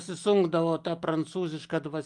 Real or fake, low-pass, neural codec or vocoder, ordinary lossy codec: real; 10.8 kHz; none; Opus, 32 kbps